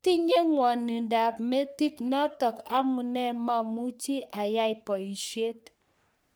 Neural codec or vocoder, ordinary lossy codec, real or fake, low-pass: codec, 44.1 kHz, 3.4 kbps, Pupu-Codec; none; fake; none